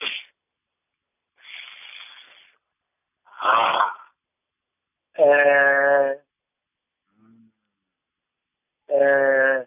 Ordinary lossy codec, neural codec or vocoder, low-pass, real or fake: none; none; 3.6 kHz; real